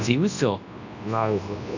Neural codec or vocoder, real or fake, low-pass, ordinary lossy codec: codec, 24 kHz, 0.9 kbps, WavTokenizer, large speech release; fake; 7.2 kHz; none